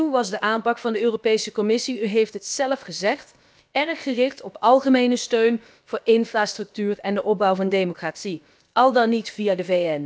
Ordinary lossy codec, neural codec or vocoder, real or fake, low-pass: none; codec, 16 kHz, about 1 kbps, DyCAST, with the encoder's durations; fake; none